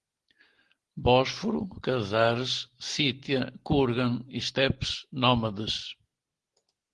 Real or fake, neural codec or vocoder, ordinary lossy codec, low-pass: real; none; Opus, 16 kbps; 10.8 kHz